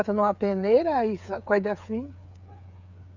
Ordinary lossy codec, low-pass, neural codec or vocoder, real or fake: none; 7.2 kHz; codec, 16 kHz, 8 kbps, FreqCodec, smaller model; fake